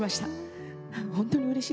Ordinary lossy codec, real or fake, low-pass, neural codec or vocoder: none; real; none; none